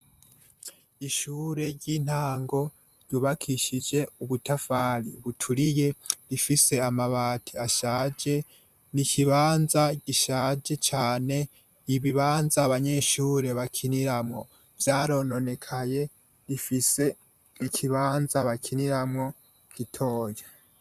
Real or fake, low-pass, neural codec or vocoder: fake; 14.4 kHz; vocoder, 44.1 kHz, 128 mel bands, Pupu-Vocoder